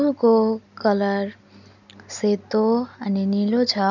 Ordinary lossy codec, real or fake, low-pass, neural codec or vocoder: none; real; 7.2 kHz; none